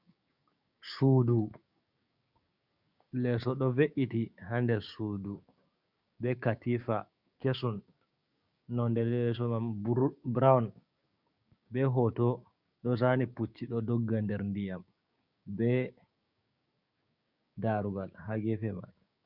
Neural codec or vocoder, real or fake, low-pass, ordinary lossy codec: codec, 16 kHz, 6 kbps, DAC; fake; 5.4 kHz; Opus, 64 kbps